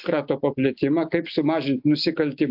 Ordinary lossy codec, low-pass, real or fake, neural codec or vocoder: AAC, 48 kbps; 5.4 kHz; real; none